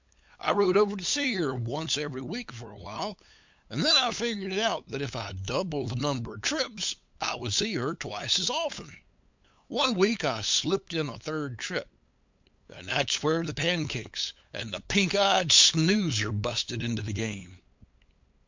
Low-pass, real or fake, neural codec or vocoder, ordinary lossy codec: 7.2 kHz; fake; codec, 16 kHz, 8 kbps, FunCodec, trained on LibriTTS, 25 frames a second; MP3, 64 kbps